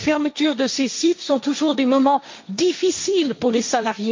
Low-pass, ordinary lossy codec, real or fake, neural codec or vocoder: 7.2 kHz; MP3, 48 kbps; fake; codec, 16 kHz, 1.1 kbps, Voila-Tokenizer